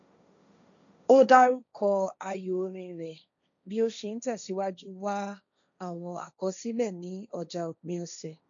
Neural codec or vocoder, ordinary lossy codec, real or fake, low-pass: codec, 16 kHz, 1.1 kbps, Voila-Tokenizer; none; fake; 7.2 kHz